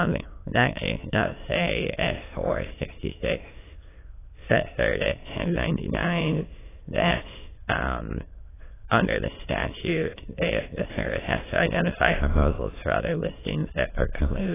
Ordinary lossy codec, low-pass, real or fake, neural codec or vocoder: AAC, 16 kbps; 3.6 kHz; fake; autoencoder, 22.05 kHz, a latent of 192 numbers a frame, VITS, trained on many speakers